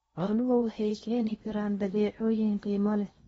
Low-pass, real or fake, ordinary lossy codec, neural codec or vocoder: 10.8 kHz; fake; AAC, 24 kbps; codec, 16 kHz in and 24 kHz out, 0.8 kbps, FocalCodec, streaming, 65536 codes